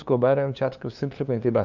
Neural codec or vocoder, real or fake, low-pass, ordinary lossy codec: codec, 16 kHz, 2 kbps, FunCodec, trained on LibriTTS, 25 frames a second; fake; 7.2 kHz; none